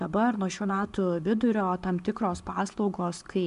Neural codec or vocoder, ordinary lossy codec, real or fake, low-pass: none; MP3, 64 kbps; real; 10.8 kHz